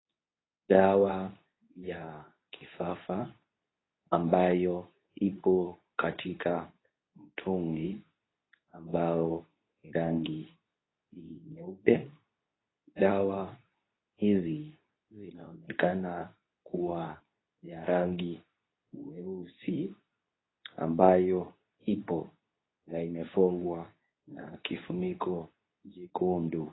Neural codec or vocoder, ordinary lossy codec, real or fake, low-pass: codec, 24 kHz, 0.9 kbps, WavTokenizer, medium speech release version 2; AAC, 16 kbps; fake; 7.2 kHz